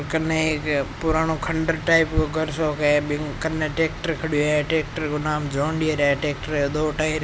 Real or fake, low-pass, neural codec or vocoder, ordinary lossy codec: real; none; none; none